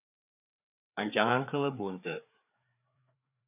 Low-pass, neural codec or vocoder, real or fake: 3.6 kHz; codec, 16 kHz, 4 kbps, FreqCodec, larger model; fake